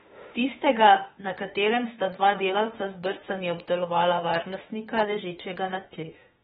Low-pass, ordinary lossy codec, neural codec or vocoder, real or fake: 19.8 kHz; AAC, 16 kbps; autoencoder, 48 kHz, 32 numbers a frame, DAC-VAE, trained on Japanese speech; fake